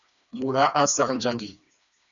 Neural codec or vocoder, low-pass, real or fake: codec, 16 kHz, 2 kbps, FreqCodec, smaller model; 7.2 kHz; fake